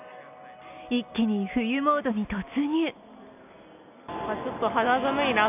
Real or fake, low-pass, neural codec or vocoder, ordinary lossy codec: real; 3.6 kHz; none; none